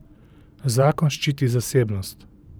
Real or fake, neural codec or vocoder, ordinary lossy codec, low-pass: fake; vocoder, 44.1 kHz, 128 mel bands every 512 samples, BigVGAN v2; none; none